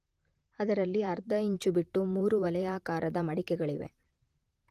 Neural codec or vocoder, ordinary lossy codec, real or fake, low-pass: vocoder, 44.1 kHz, 128 mel bands, Pupu-Vocoder; Opus, 24 kbps; fake; 14.4 kHz